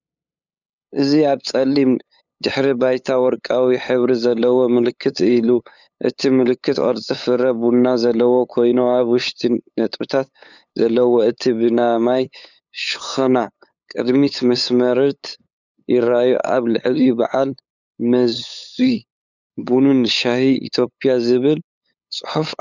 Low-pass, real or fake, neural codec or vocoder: 7.2 kHz; fake; codec, 16 kHz, 8 kbps, FunCodec, trained on LibriTTS, 25 frames a second